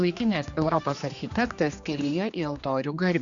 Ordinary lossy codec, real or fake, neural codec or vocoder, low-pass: Opus, 64 kbps; fake; codec, 16 kHz, 2 kbps, X-Codec, HuBERT features, trained on general audio; 7.2 kHz